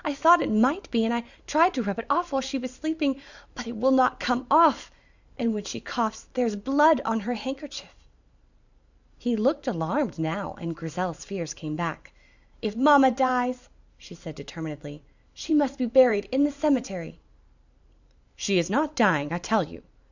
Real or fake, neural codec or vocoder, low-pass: fake; vocoder, 44.1 kHz, 128 mel bands every 512 samples, BigVGAN v2; 7.2 kHz